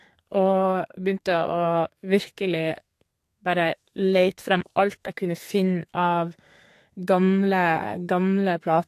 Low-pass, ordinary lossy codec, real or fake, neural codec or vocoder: 14.4 kHz; AAC, 64 kbps; fake; codec, 32 kHz, 1.9 kbps, SNAC